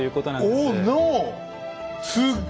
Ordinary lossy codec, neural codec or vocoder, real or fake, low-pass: none; none; real; none